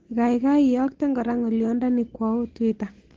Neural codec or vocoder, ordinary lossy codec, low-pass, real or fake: none; Opus, 16 kbps; 7.2 kHz; real